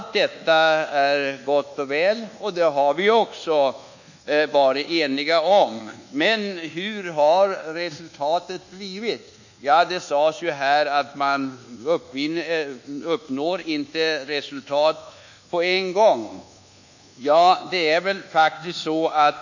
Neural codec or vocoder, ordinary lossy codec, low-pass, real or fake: codec, 24 kHz, 1.2 kbps, DualCodec; none; 7.2 kHz; fake